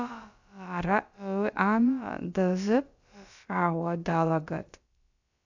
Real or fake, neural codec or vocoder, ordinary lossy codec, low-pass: fake; codec, 16 kHz, about 1 kbps, DyCAST, with the encoder's durations; AAC, 48 kbps; 7.2 kHz